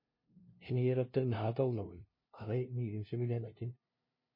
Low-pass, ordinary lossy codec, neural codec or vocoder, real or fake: 5.4 kHz; MP3, 24 kbps; codec, 16 kHz, 0.5 kbps, FunCodec, trained on LibriTTS, 25 frames a second; fake